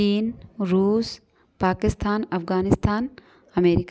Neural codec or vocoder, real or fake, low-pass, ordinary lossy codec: none; real; none; none